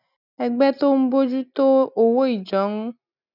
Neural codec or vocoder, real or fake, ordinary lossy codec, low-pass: none; real; none; 5.4 kHz